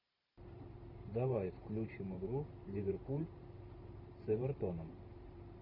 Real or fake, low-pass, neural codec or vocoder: fake; 5.4 kHz; vocoder, 44.1 kHz, 128 mel bands every 512 samples, BigVGAN v2